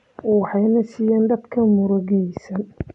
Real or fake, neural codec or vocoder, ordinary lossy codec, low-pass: real; none; none; 10.8 kHz